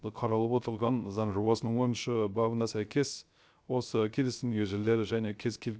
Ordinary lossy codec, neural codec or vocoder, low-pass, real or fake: none; codec, 16 kHz, 0.3 kbps, FocalCodec; none; fake